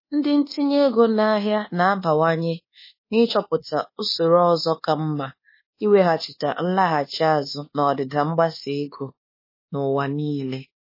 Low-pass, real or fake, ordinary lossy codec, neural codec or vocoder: 5.4 kHz; fake; MP3, 24 kbps; codec, 24 kHz, 1.2 kbps, DualCodec